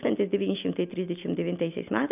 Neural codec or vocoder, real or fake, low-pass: none; real; 3.6 kHz